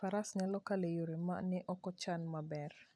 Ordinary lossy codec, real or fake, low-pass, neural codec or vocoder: none; real; none; none